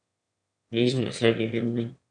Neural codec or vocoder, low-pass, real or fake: autoencoder, 22.05 kHz, a latent of 192 numbers a frame, VITS, trained on one speaker; 9.9 kHz; fake